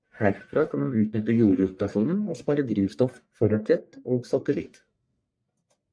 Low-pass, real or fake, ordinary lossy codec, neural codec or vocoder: 9.9 kHz; fake; MP3, 64 kbps; codec, 44.1 kHz, 1.7 kbps, Pupu-Codec